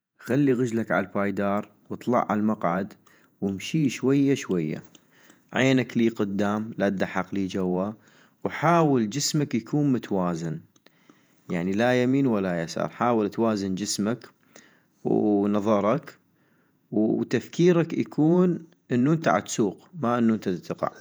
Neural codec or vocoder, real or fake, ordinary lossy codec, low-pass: vocoder, 48 kHz, 128 mel bands, Vocos; fake; none; none